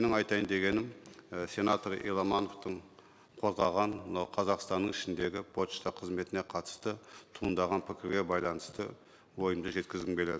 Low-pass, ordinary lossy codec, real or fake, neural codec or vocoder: none; none; real; none